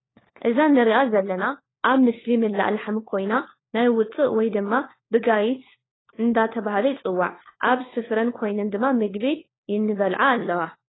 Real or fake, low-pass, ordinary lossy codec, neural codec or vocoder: fake; 7.2 kHz; AAC, 16 kbps; codec, 16 kHz, 4 kbps, FunCodec, trained on LibriTTS, 50 frames a second